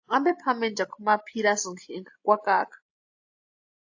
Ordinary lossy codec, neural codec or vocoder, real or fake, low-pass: AAC, 48 kbps; none; real; 7.2 kHz